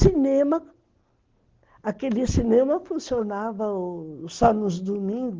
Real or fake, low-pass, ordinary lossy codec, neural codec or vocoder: real; 7.2 kHz; Opus, 16 kbps; none